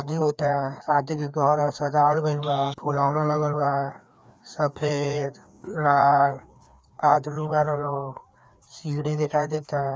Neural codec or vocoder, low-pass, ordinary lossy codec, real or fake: codec, 16 kHz, 2 kbps, FreqCodec, larger model; none; none; fake